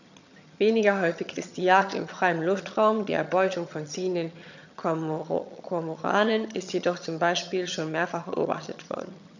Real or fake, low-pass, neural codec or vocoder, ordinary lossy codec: fake; 7.2 kHz; vocoder, 22.05 kHz, 80 mel bands, HiFi-GAN; none